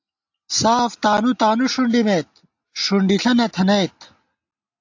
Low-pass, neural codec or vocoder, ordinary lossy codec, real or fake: 7.2 kHz; none; AAC, 48 kbps; real